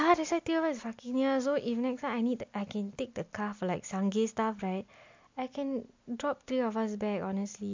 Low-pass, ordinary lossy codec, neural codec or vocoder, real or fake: 7.2 kHz; MP3, 48 kbps; none; real